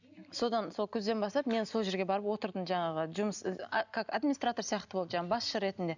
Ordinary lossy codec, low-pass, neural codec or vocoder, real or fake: none; 7.2 kHz; none; real